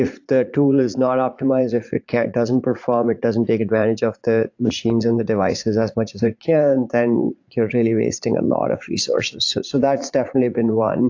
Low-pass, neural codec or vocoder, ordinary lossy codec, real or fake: 7.2 kHz; vocoder, 44.1 kHz, 80 mel bands, Vocos; AAC, 48 kbps; fake